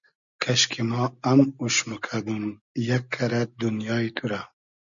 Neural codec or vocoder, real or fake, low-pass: none; real; 7.2 kHz